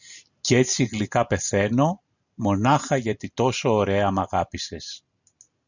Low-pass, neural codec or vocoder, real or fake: 7.2 kHz; none; real